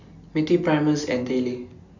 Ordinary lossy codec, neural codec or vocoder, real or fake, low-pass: none; none; real; 7.2 kHz